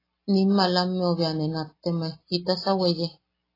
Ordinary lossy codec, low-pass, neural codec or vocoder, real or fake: AAC, 24 kbps; 5.4 kHz; none; real